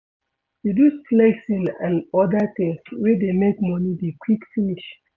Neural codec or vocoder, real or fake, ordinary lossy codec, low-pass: none; real; none; 7.2 kHz